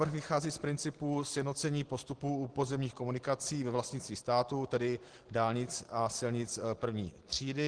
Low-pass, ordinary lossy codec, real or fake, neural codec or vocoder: 9.9 kHz; Opus, 16 kbps; real; none